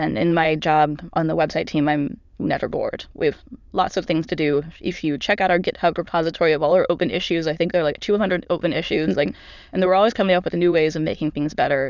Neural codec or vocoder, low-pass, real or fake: autoencoder, 22.05 kHz, a latent of 192 numbers a frame, VITS, trained on many speakers; 7.2 kHz; fake